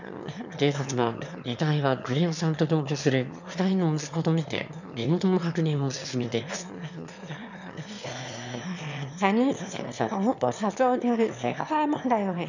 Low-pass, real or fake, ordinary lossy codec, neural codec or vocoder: 7.2 kHz; fake; none; autoencoder, 22.05 kHz, a latent of 192 numbers a frame, VITS, trained on one speaker